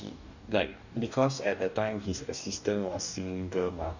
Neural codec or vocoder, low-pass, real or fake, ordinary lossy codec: codec, 44.1 kHz, 2.6 kbps, DAC; 7.2 kHz; fake; none